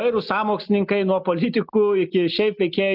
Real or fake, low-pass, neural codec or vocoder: real; 5.4 kHz; none